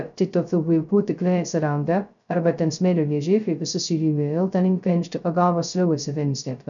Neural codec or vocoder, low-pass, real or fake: codec, 16 kHz, 0.2 kbps, FocalCodec; 7.2 kHz; fake